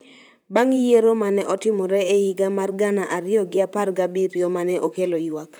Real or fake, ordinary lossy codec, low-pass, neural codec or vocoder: fake; none; none; vocoder, 44.1 kHz, 128 mel bands, Pupu-Vocoder